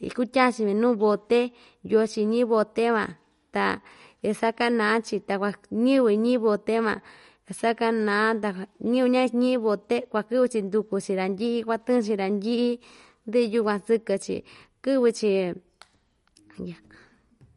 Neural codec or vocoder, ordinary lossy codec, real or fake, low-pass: none; MP3, 48 kbps; real; 19.8 kHz